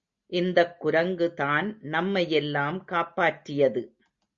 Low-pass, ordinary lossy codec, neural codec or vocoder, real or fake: 7.2 kHz; Opus, 64 kbps; none; real